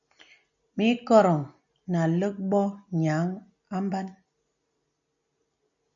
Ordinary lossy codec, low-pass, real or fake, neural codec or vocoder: MP3, 96 kbps; 7.2 kHz; real; none